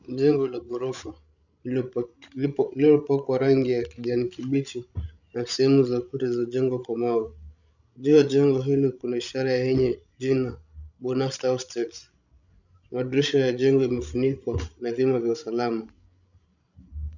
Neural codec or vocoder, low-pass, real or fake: codec, 16 kHz, 16 kbps, FreqCodec, larger model; 7.2 kHz; fake